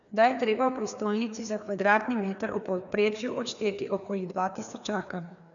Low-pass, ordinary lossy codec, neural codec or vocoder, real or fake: 7.2 kHz; none; codec, 16 kHz, 2 kbps, FreqCodec, larger model; fake